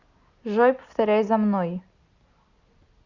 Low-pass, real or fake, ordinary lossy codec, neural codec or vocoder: 7.2 kHz; real; Opus, 64 kbps; none